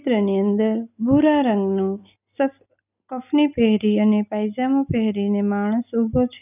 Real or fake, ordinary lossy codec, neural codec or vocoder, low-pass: real; none; none; 3.6 kHz